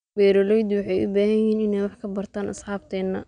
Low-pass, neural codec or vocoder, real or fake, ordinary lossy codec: 9.9 kHz; none; real; none